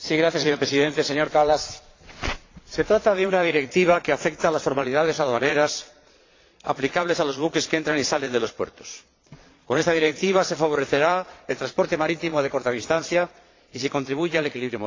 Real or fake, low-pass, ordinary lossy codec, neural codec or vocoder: fake; 7.2 kHz; AAC, 32 kbps; vocoder, 22.05 kHz, 80 mel bands, Vocos